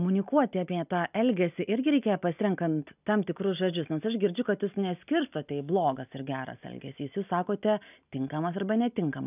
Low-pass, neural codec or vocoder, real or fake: 3.6 kHz; none; real